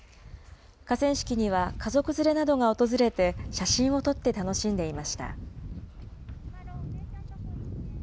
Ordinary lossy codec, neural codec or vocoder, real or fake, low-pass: none; none; real; none